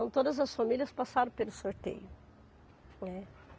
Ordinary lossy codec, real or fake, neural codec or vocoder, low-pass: none; real; none; none